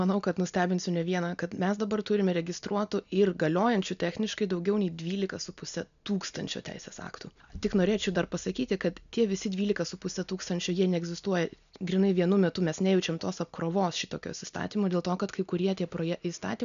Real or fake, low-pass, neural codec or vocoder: real; 7.2 kHz; none